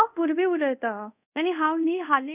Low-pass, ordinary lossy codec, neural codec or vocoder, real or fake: 3.6 kHz; none; codec, 24 kHz, 0.5 kbps, DualCodec; fake